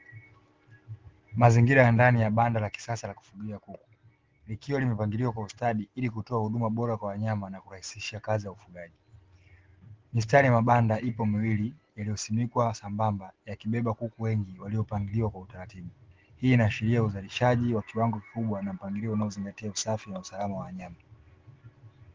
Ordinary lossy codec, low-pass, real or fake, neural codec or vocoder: Opus, 16 kbps; 7.2 kHz; real; none